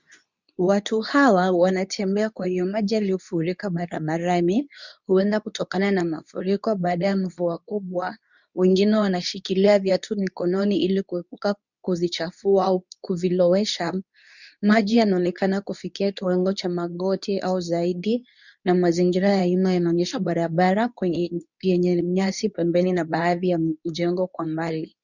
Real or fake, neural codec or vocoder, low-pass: fake; codec, 24 kHz, 0.9 kbps, WavTokenizer, medium speech release version 2; 7.2 kHz